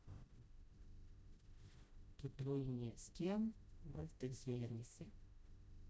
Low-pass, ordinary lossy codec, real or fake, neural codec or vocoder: none; none; fake; codec, 16 kHz, 0.5 kbps, FreqCodec, smaller model